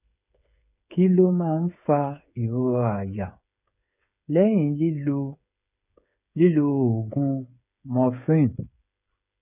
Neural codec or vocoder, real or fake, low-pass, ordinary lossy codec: codec, 16 kHz, 8 kbps, FreqCodec, smaller model; fake; 3.6 kHz; none